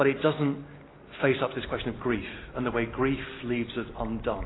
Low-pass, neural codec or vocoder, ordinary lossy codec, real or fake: 7.2 kHz; none; AAC, 16 kbps; real